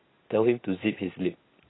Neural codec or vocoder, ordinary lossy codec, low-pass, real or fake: none; AAC, 16 kbps; 7.2 kHz; real